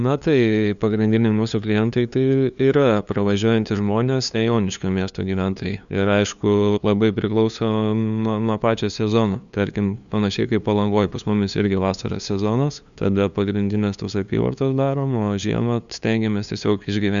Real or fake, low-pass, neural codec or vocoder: fake; 7.2 kHz; codec, 16 kHz, 2 kbps, FunCodec, trained on LibriTTS, 25 frames a second